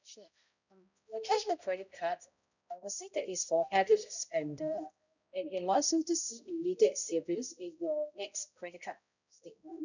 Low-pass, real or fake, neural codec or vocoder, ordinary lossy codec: 7.2 kHz; fake; codec, 16 kHz, 0.5 kbps, X-Codec, HuBERT features, trained on balanced general audio; none